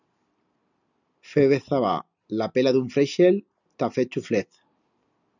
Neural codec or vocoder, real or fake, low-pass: none; real; 7.2 kHz